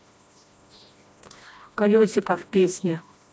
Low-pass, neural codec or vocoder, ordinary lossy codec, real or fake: none; codec, 16 kHz, 1 kbps, FreqCodec, smaller model; none; fake